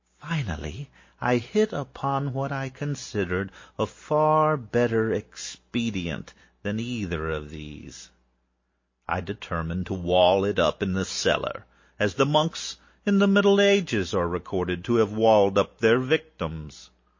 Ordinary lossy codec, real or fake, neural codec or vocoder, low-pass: MP3, 32 kbps; real; none; 7.2 kHz